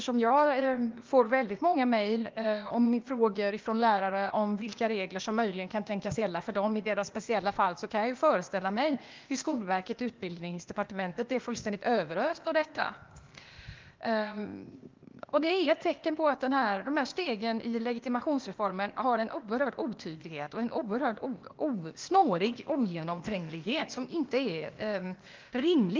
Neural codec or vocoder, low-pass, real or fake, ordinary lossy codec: codec, 16 kHz, 0.8 kbps, ZipCodec; 7.2 kHz; fake; Opus, 24 kbps